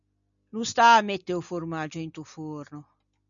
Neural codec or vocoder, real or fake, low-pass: none; real; 7.2 kHz